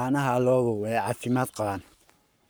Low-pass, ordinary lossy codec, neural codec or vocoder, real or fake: none; none; codec, 44.1 kHz, 7.8 kbps, Pupu-Codec; fake